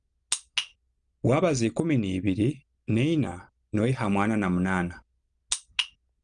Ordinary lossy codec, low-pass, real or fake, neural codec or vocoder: Opus, 32 kbps; 9.9 kHz; real; none